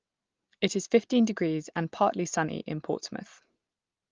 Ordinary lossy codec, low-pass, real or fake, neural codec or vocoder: Opus, 32 kbps; 7.2 kHz; real; none